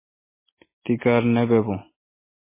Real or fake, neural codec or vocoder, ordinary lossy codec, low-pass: real; none; MP3, 16 kbps; 3.6 kHz